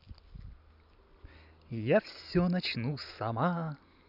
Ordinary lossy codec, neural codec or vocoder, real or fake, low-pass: none; none; real; 5.4 kHz